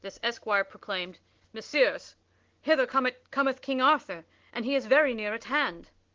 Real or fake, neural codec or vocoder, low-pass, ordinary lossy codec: real; none; 7.2 kHz; Opus, 16 kbps